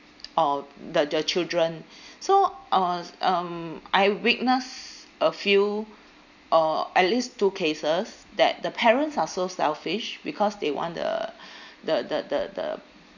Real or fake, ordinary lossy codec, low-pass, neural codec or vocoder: real; none; 7.2 kHz; none